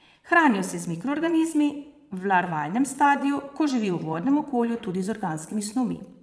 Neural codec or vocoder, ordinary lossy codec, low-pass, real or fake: vocoder, 22.05 kHz, 80 mel bands, Vocos; none; none; fake